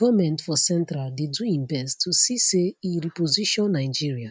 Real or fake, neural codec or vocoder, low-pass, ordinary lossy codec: real; none; none; none